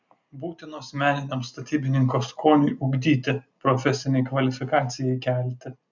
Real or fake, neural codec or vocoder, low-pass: real; none; 7.2 kHz